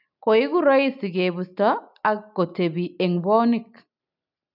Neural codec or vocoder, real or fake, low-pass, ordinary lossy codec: none; real; 5.4 kHz; none